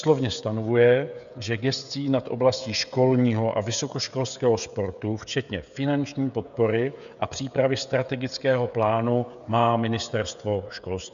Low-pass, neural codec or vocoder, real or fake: 7.2 kHz; codec, 16 kHz, 16 kbps, FreqCodec, smaller model; fake